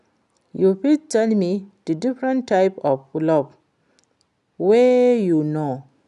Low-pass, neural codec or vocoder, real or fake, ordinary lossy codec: 10.8 kHz; none; real; none